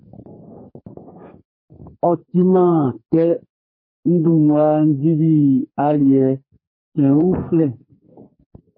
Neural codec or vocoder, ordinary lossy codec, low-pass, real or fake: codec, 32 kHz, 1.9 kbps, SNAC; MP3, 24 kbps; 5.4 kHz; fake